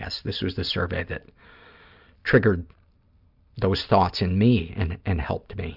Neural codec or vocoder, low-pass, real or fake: none; 5.4 kHz; real